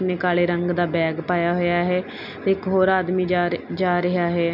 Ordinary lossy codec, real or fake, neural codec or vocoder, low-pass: none; real; none; 5.4 kHz